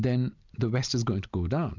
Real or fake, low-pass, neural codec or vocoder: real; 7.2 kHz; none